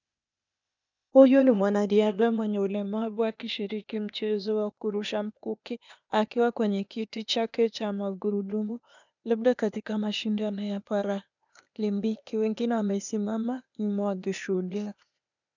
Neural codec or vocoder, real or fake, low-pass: codec, 16 kHz, 0.8 kbps, ZipCodec; fake; 7.2 kHz